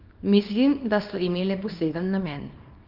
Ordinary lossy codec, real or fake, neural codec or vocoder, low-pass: Opus, 24 kbps; fake; codec, 24 kHz, 0.9 kbps, WavTokenizer, small release; 5.4 kHz